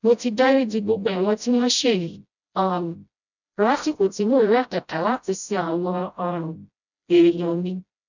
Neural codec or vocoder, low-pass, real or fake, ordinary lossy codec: codec, 16 kHz, 0.5 kbps, FreqCodec, smaller model; 7.2 kHz; fake; none